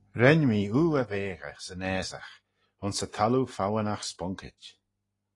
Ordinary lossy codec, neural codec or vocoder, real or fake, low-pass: AAC, 32 kbps; none; real; 10.8 kHz